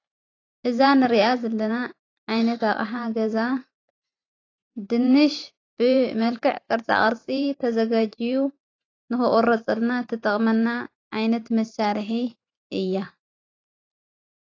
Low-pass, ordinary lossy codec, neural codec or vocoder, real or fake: 7.2 kHz; AAC, 32 kbps; vocoder, 44.1 kHz, 128 mel bands every 512 samples, BigVGAN v2; fake